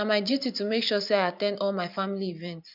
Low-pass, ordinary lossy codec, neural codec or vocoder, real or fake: 5.4 kHz; none; vocoder, 44.1 kHz, 128 mel bands every 512 samples, BigVGAN v2; fake